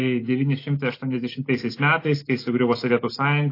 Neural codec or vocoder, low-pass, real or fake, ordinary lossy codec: none; 5.4 kHz; real; AAC, 32 kbps